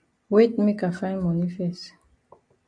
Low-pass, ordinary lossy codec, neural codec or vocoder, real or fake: 9.9 kHz; AAC, 64 kbps; none; real